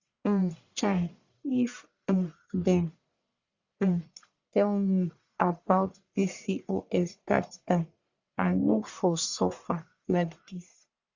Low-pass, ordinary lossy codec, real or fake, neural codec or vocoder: 7.2 kHz; Opus, 64 kbps; fake; codec, 44.1 kHz, 1.7 kbps, Pupu-Codec